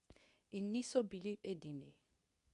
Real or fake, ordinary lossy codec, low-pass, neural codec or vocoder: fake; none; 10.8 kHz; codec, 24 kHz, 0.9 kbps, WavTokenizer, medium speech release version 2